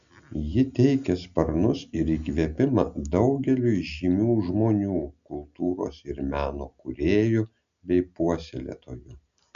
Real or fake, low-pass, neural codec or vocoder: real; 7.2 kHz; none